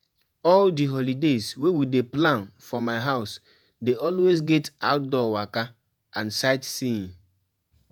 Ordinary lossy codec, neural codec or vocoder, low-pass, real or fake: none; vocoder, 48 kHz, 128 mel bands, Vocos; none; fake